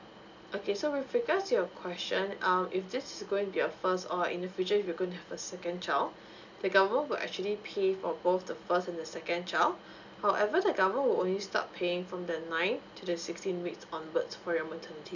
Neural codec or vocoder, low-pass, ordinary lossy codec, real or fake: none; 7.2 kHz; none; real